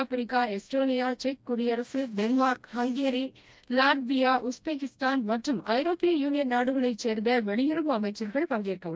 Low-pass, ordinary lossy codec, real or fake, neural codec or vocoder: none; none; fake; codec, 16 kHz, 1 kbps, FreqCodec, smaller model